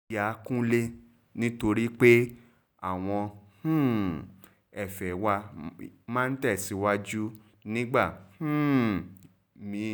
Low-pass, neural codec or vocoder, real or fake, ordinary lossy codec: none; none; real; none